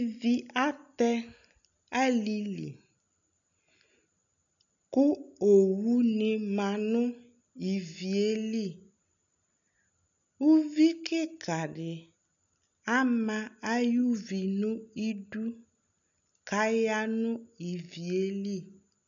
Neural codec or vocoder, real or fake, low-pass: none; real; 7.2 kHz